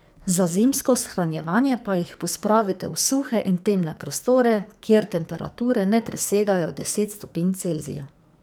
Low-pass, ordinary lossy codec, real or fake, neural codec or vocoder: none; none; fake; codec, 44.1 kHz, 2.6 kbps, SNAC